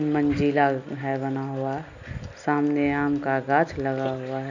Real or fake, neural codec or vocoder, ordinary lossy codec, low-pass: real; none; none; 7.2 kHz